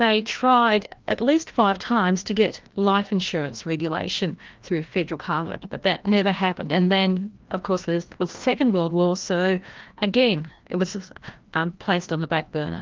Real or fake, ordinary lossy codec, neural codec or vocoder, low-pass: fake; Opus, 32 kbps; codec, 16 kHz, 1 kbps, FreqCodec, larger model; 7.2 kHz